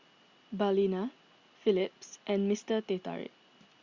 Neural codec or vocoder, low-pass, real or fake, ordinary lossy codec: none; 7.2 kHz; real; Opus, 64 kbps